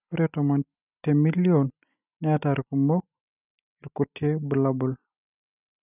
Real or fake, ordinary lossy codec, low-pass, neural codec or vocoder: real; none; 3.6 kHz; none